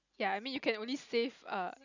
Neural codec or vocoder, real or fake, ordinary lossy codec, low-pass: none; real; none; 7.2 kHz